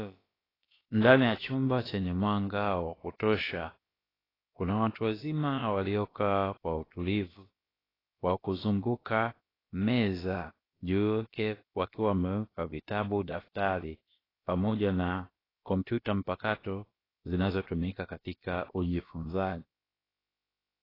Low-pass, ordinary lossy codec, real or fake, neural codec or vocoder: 5.4 kHz; AAC, 24 kbps; fake; codec, 16 kHz, about 1 kbps, DyCAST, with the encoder's durations